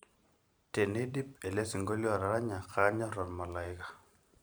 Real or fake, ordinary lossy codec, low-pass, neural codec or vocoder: fake; none; none; vocoder, 44.1 kHz, 128 mel bands every 512 samples, BigVGAN v2